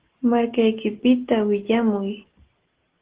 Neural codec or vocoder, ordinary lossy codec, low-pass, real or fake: none; Opus, 16 kbps; 3.6 kHz; real